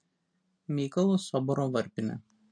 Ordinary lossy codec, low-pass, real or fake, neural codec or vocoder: MP3, 48 kbps; 9.9 kHz; real; none